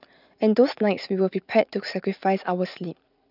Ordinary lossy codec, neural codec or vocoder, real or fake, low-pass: none; vocoder, 44.1 kHz, 80 mel bands, Vocos; fake; 5.4 kHz